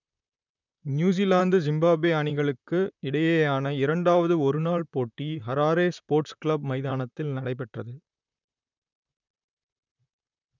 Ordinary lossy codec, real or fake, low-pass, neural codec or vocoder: none; fake; 7.2 kHz; vocoder, 44.1 kHz, 128 mel bands, Pupu-Vocoder